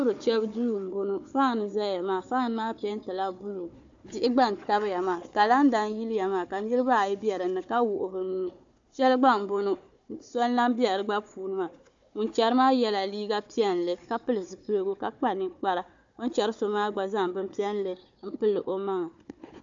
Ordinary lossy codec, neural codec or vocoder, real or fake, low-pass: AAC, 64 kbps; codec, 16 kHz, 4 kbps, FunCodec, trained on Chinese and English, 50 frames a second; fake; 7.2 kHz